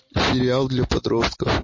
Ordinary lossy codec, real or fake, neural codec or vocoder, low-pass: MP3, 32 kbps; real; none; 7.2 kHz